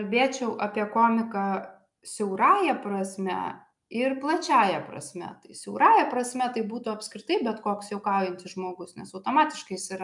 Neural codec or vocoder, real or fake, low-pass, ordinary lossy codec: none; real; 10.8 kHz; MP3, 96 kbps